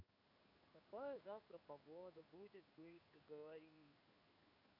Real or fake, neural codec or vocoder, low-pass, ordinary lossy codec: fake; codec, 16 kHz in and 24 kHz out, 1 kbps, XY-Tokenizer; 5.4 kHz; none